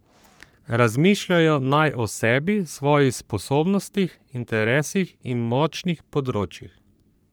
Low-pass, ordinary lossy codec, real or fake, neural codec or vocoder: none; none; fake; codec, 44.1 kHz, 3.4 kbps, Pupu-Codec